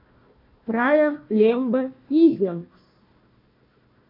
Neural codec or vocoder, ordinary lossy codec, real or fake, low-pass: codec, 16 kHz, 1 kbps, FunCodec, trained on Chinese and English, 50 frames a second; MP3, 32 kbps; fake; 5.4 kHz